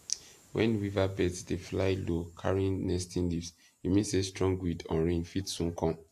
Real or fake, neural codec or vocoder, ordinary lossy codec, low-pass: real; none; AAC, 64 kbps; 14.4 kHz